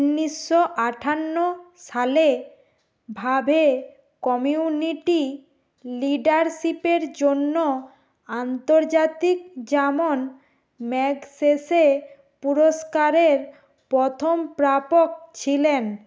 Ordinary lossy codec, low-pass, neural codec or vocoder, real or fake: none; none; none; real